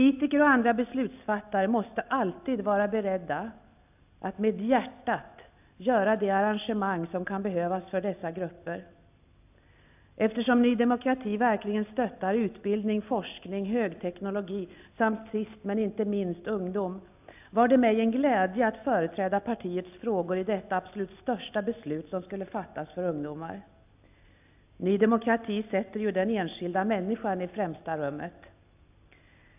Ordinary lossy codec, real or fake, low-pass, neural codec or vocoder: AAC, 32 kbps; real; 3.6 kHz; none